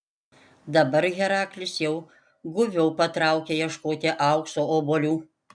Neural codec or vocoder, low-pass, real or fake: none; 9.9 kHz; real